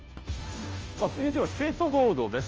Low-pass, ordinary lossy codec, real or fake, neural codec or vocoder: 7.2 kHz; Opus, 24 kbps; fake; codec, 16 kHz, 0.5 kbps, FunCodec, trained on Chinese and English, 25 frames a second